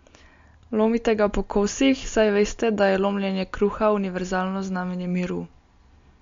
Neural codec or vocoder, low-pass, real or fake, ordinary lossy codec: none; 7.2 kHz; real; MP3, 48 kbps